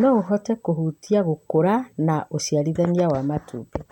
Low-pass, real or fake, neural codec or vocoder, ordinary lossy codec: 19.8 kHz; real; none; MP3, 96 kbps